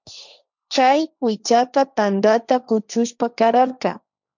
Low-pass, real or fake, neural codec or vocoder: 7.2 kHz; fake; codec, 16 kHz, 1.1 kbps, Voila-Tokenizer